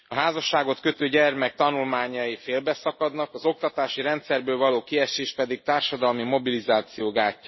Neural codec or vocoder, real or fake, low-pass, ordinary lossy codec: none; real; 7.2 kHz; MP3, 24 kbps